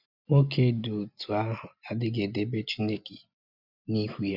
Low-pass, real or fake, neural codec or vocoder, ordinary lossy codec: 5.4 kHz; real; none; none